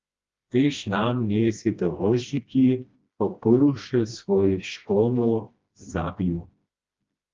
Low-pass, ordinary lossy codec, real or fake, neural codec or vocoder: 7.2 kHz; Opus, 32 kbps; fake; codec, 16 kHz, 1 kbps, FreqCodec, smaller model